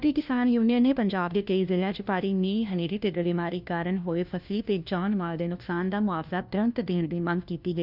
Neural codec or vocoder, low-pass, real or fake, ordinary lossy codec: codec, 16 kHz, 1 kbps, FunCodec, trained on LibriTTS, 50 frames a second; 5.4 kHz; fake; none